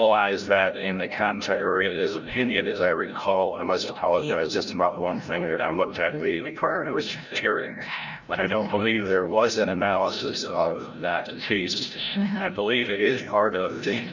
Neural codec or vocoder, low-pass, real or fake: codec, 16 kHz, 0.5 kbps, FreqCodec, larger model; 7.2 kHz; fake